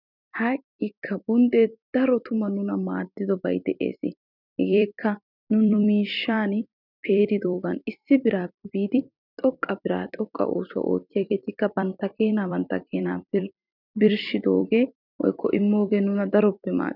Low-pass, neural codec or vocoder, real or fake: 5.4 kHz; vocoder, 44.1 kHz, 128 mel bands every 256 samples, BigVGAN v2; fake